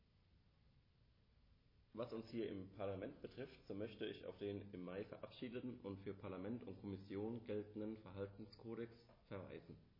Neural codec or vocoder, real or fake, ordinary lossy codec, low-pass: none; real; MP3, 24 kbps; 5.4 kHz